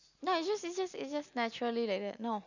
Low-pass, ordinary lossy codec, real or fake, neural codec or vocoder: 7.2 kHz; none; real; none